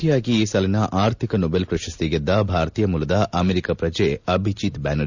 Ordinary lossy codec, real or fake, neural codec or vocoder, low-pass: none; real; none; 7.2 kHz